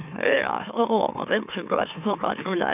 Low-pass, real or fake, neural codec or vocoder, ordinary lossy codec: 3.6 kHz; fake; autoencoder, 44.1 kHz, a latent of 192 numbers a frame, MeloTTS; none